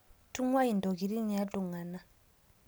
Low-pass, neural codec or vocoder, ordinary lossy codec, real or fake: none; none; none; real